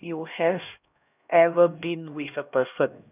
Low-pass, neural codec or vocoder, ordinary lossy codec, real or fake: 3.6 kHz; codec, 16 kHz, 1 kbps, X-Codec, HuBERT features, trained on LibriSpeech; none; fake